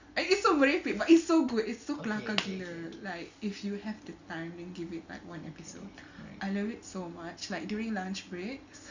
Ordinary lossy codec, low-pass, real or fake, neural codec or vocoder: Opus, 64 kbps; 7.2 kHz; real; none